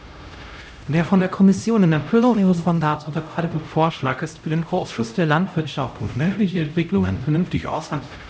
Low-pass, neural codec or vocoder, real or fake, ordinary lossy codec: none; codec, 16 kHz, 0.5 kbps, X-Codec, HuBERT features, trained on LibriSpeech; fake; none